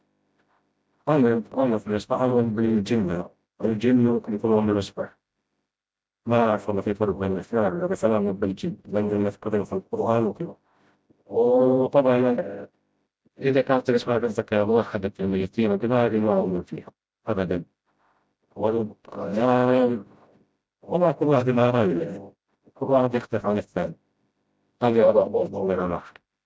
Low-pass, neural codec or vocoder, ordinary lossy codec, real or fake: none; codec, 16 kHz, 0.5 kbps, FreqCodec, smaller model; none; fake